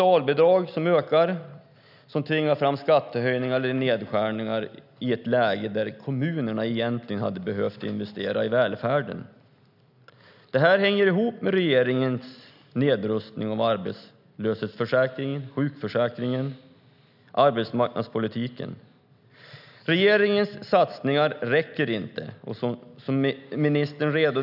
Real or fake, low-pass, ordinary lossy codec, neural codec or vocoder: real; 5.4 kHz; none; none